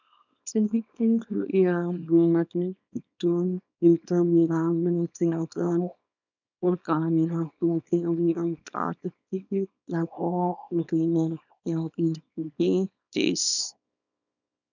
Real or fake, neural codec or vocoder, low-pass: fake; codec, 24 kHz, 0.9 kbps, WavTokenizer, small release; 7.2 kHz